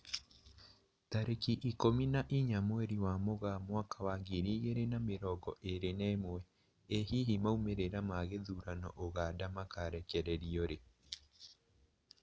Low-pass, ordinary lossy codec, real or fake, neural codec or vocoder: none; none; real; none